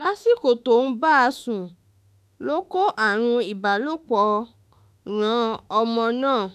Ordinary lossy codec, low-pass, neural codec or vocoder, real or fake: none; 14.4 kHz; autoencoder, 48 kHz, 32 numbers a frame, DAC-VAE, trained on Japanese speech; fake